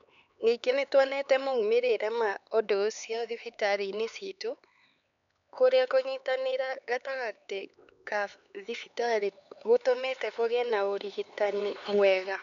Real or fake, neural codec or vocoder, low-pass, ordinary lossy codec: fake; codec, 16 kHz, 4 kbps, X-Codec, HuBERT features, trained on LibriSpeech; 7.2 kHz; none